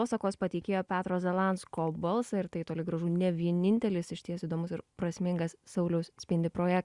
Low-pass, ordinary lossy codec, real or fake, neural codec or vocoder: 10.8 kHz; Opus, 64 kbps; real; none